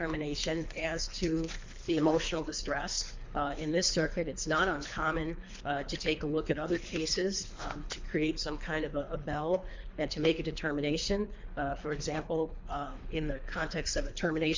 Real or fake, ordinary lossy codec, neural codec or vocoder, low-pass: fake; MP3, 64 kbps; codec, 24 kHz, 3 kbps, HILCodec; 7.2 kHz